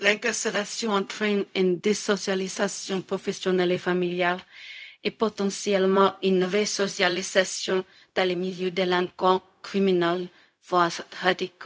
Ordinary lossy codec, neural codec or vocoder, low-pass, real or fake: none; codec, 16 kHz, 0.4 kbps, LongCat-Audio-Codec; none; fake